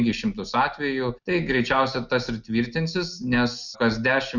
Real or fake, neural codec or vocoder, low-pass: real; none; 7.2 kHz